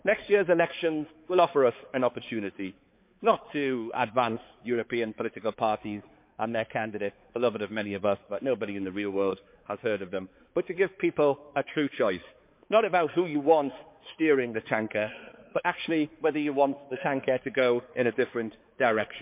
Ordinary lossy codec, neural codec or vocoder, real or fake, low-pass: MP3, 32 kbps; codec, 16 kHz, 4 kbps, X-Codec, HuBERT features, trained on balanced general audio; fake; 3.6 kHz